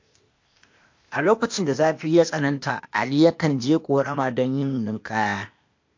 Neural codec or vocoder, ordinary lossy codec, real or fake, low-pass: codec, 16 kHz, 0.8 kbps, ZipCodec; MP3, 48 kbps; fake; 7.2 kHz